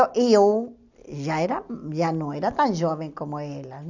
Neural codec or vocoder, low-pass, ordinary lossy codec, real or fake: none; 7.2 kHz; none; real